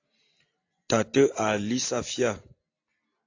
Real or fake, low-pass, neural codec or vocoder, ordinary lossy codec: real; 7.2 kHz; none; AAC, 48 kbps